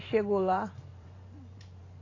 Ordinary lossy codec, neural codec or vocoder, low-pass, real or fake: AAC, 32 kbps; vocoder, 44.1 kHz, 128 mel bands every 512 samples, BigVGAN v2; 7.2 kHz; fake